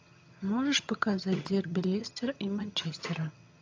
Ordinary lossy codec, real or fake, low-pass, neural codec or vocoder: AAC, 48 kbps; fake; 7.2 kHz; vocoder, 22.05 kHz, 80 mel bands, HiFi-GAN